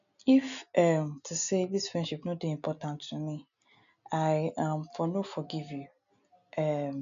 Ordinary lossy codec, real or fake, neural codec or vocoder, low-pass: none; real; none; 7.2 kHz